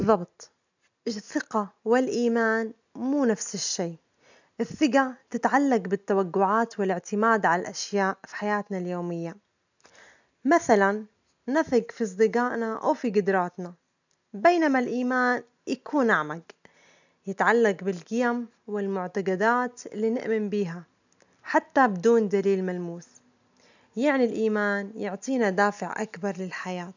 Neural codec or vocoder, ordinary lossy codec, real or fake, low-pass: none; none; real; 7.2 kHz